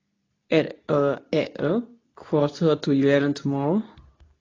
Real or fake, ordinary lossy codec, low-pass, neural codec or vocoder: fake; AAC, 32 kbps; 7.2 kHz; codec, 24 kHz, 0.9 kbps, WavTokenizer, medium speech release version 2